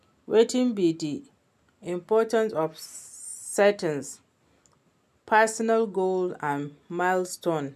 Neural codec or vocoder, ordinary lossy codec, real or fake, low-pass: none; none; real; 14.4 kHz